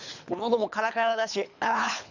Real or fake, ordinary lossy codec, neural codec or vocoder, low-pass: fake; none; codec, 24 kHz, 3 kbps, HILCodec; 7.2 kHz